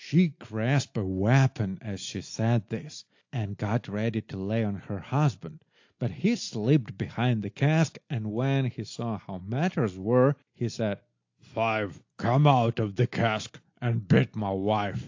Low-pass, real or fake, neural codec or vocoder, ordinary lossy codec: 7.2 kHz; real; none; AAC, 48 kbps